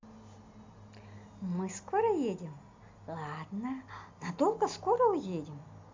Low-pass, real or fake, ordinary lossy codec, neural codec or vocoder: 7.2 kHz; real; MP3, 64 kbps; none